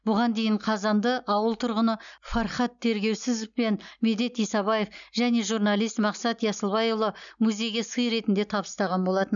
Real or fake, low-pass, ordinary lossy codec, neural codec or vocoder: real; 7.2 kHz; none; none